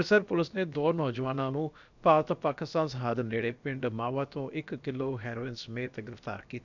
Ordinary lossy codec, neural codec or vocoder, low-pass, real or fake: none; codec, 16 kHz, about 1 kbps, DyCAST, with the encoder's durations; 7.2 kHz; fake